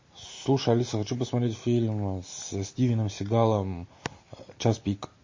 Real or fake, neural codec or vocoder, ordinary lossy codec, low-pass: real; none; MP3, 32 kbps; 7.2 kHz